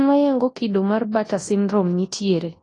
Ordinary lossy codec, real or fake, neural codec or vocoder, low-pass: AAC, 32 kbps; fake; codec, 24 kHz, 0.9 kbps, WavTokenizer, large speech release; 10.8 kHz